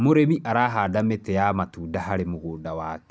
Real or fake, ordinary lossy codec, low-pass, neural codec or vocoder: real; none; none; none